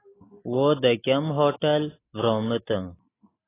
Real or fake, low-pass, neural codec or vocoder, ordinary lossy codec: real; 3.6 kHz; none; AAC, 16 kbps